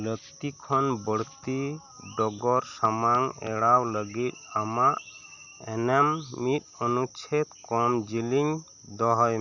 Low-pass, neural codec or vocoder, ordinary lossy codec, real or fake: 7.2 kHz; none; none; real